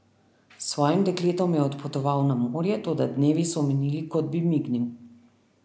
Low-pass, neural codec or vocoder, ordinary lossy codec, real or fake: none; none; none; real